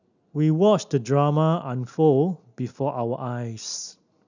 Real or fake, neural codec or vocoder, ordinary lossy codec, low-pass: real; none; none; 7.2 kHz